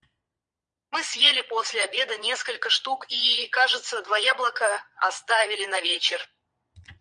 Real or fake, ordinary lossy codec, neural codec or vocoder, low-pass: fake; MP3, 96 kbps; vocoder, 22.05 kHz, 80 mel bands, Vocos; 9.9 kHz